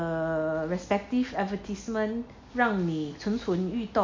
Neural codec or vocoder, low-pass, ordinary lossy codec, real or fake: none; 7.2 kHz; MP3, 64 kbps; real